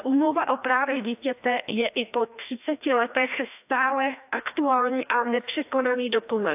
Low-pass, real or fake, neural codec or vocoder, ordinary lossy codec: 3.6 kHz; fake; codec, 16 kHz, 1 kbps, FreqCodec, larger model; AAC, 32 kbps